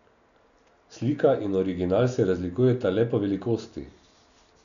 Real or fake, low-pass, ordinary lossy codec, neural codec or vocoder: real; 7.2 kHz; none; none